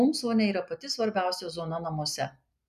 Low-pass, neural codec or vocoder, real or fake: 14.4 kHz; none; real